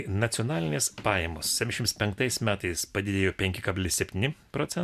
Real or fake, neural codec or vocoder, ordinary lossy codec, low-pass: real; none; MP3, 96 kbps; 14.4 kHz